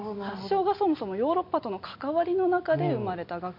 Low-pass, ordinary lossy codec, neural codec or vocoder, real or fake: 5.4 kHz; MP3, 32 kbps; none; real